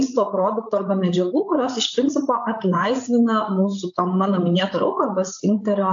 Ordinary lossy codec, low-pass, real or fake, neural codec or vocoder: AAC, 64 kbps; 7.2 kHz; fake; codec, 16 kHz, 8 kbps, FreqCodec, larger model